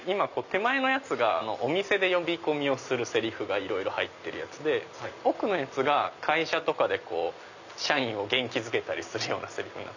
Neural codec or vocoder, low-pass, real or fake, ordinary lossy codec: none; 7.2 kHz; real; none